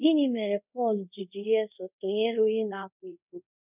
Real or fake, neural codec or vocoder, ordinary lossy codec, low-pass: fake; codec, 24 kHz, 0.5 kbps, DualCodec; none; 3.6 kHz